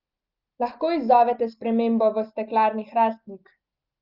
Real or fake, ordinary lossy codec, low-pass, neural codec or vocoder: real; Opus, 24 kbps; 5.4 kHz; none